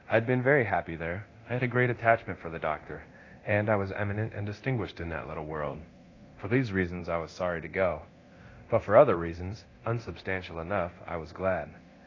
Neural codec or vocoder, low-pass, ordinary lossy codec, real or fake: codec, 24 kHz, 0.9 kbps, DualCodec; 7.2 kHz; AAC, 32 kbps; fake